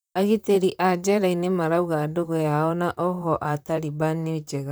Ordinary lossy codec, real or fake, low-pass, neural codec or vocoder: none; fake; none; vocoder, 44.1 kHz, 128 mel bands, Pupu-Vocoder